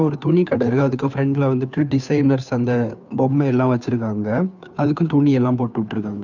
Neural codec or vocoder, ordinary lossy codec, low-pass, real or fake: codec, 16 kHz, 2 kbps, FunCodec, trained on Chinese and English, 25 frames a second; none; 7.2 kHz; fake